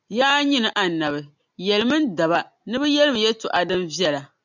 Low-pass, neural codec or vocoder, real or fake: 7.2 kHz; none; real